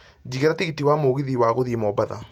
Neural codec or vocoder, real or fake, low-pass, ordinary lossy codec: vocoder, 48 kHz, 128 mel bands, Vocos; fake; 19.8 kHz; none